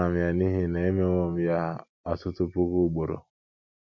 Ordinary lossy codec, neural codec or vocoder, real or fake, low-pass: MP3, 48 kbps; none; real; 7.2 kHz